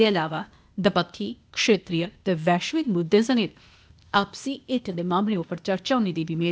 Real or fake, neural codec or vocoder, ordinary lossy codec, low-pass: fake; codec, 16 kHz, 0.8 kbps, ZipCodec; none; none